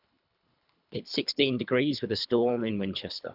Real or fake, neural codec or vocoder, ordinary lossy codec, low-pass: fake; codec, 24 kHz, 3 kbps, HILCodec; none; 5.4 kHz